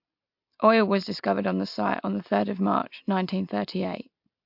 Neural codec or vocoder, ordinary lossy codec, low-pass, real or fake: none; MP3, 48 kbps; 5.4 kHz; real